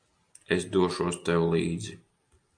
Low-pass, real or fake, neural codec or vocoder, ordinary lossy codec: 9.9 kHz; real; none; AAC, 64 kbps